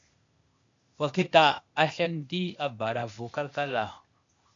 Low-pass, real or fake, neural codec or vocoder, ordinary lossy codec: 7.2 kHz; fake; codec, 16 kHz, 0.8 kbps, ZipCodec; MP3, 64 kbps